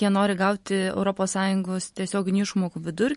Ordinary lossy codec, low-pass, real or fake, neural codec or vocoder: MP3, 48 kbps; 14.4 kHz; fake; vocoder, 44.1 kHz, 128 mel bands every 512 samples, BigVGAN v2